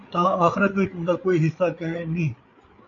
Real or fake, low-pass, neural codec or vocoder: fake; 7.2 kHz; codec, 16 kHz, 8 kbps, FreqCodec, larger model